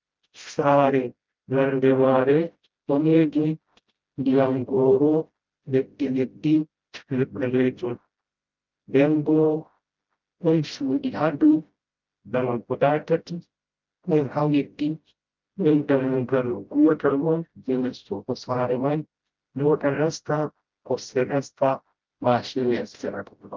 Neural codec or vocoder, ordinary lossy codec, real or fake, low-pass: codec, 16 kHz, 0.5 kbps, FreqCodec, smaller model; Opus, 32 kbps; fake; 7.2 kHz